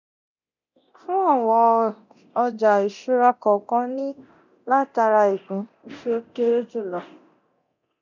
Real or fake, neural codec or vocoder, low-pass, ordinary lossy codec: fake; codec, 24 kHz, 0.9 kbps, DualCodec; 7.2 kHz; none